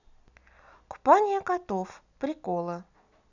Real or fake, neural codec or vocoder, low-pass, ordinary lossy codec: real; none; 7.2 kHz; none